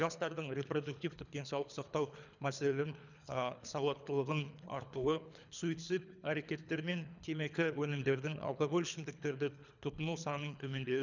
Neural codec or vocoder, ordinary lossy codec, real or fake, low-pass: codec, 24 kHz, 3 kbps, HILCodec; none; fake; 7.2 kHz